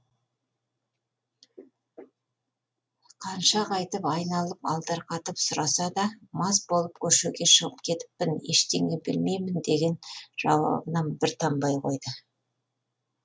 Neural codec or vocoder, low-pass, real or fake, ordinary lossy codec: none; none; real; none